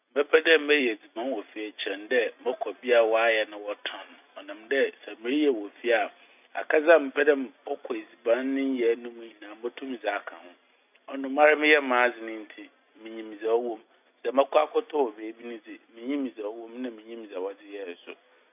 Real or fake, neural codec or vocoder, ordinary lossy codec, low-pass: real; none; none; 3.6 kHz